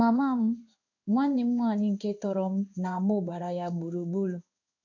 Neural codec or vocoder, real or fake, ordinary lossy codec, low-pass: codec, 16 kHz in and 24 kHz out, 1 kbps, XY-Tokenizer; fake; none; 7.2 kHz